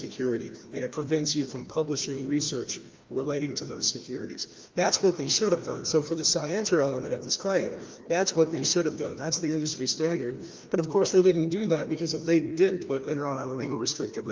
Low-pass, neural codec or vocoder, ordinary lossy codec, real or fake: 7.2 kHz; codec, 16 kHz, 1 kbps, FreqCodec, larger model; Opus, 32 kbps; fake